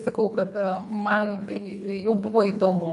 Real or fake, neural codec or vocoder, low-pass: fake; codec, 24 kHz, 1.5 kbps, HILCodec; 10.8 kHz